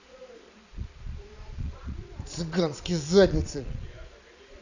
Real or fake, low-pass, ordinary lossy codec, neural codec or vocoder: fake; 7.2 kHz; none; vocoder, 22.05 kHz, 80 mel bands, Vocos